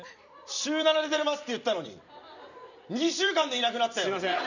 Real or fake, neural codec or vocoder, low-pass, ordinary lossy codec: fake; vocoder, 44.1 kHz, 128 mel bands every 256 samples, BigVGAN v2; 7.2 kHz; none